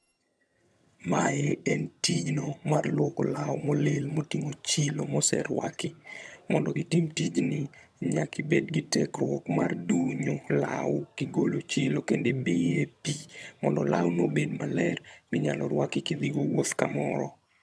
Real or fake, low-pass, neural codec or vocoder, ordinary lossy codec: fake; none; vocoder, 22.05 kHz, 80 mel bands, HiFi-GAN; none